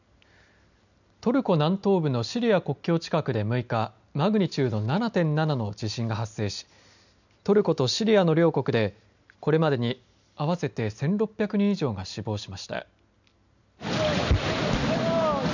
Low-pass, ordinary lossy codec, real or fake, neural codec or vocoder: 7.2 kHz; none; real; none